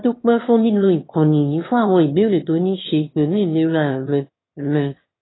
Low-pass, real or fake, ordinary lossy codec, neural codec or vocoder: 7.2 kHz; fake; AAC, 16 kbps; autoencoder, 22.05 kHz, a latent of 192 numbers a frame, VITS, trained on one speaker